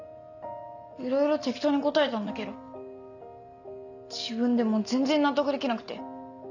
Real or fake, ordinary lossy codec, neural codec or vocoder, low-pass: real; none; none; 7.2 kHz